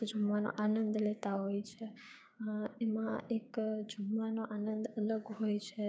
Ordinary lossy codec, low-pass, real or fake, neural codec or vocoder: none; none; fake; codec, 16 kHz, 6 kbps, DAC